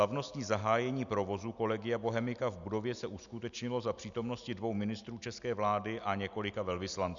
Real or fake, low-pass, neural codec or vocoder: real; 7.2 kHz; none